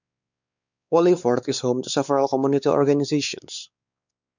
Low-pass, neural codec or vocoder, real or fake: 7.2 kHz; codec, 16 kHz, 4 kbps, X-Codec, WavLM features, trained on Multilingual LibriSpeech; fake